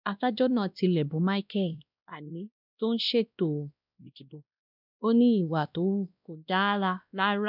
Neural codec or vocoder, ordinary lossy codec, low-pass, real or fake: codec, 16 kHz, 1 kbps, X-Codec, WavLM features, trained on Multilingual LibriSpeech; none; 5.4 kHz; fake